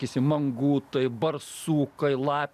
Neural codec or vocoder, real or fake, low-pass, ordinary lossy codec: none; real; 14.4 kHz; MP3, 96 kbps